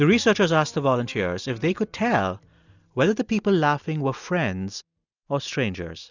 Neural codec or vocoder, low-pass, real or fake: none; 7.2 kHz; real